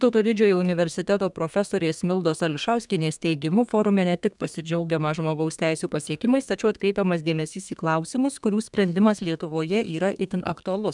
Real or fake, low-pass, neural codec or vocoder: fake; 10.8 kHz; codec, 32 kHz, 1.9 kbps, SNAC